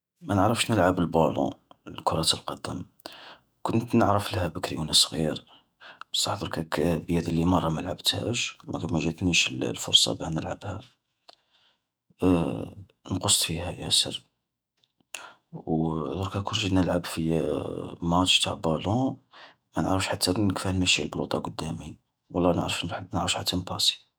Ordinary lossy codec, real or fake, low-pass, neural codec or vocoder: none; fake; none; autoencoder, 48 kHz, 128 numbers a frame, DAC-VAE, trained on Japanese speech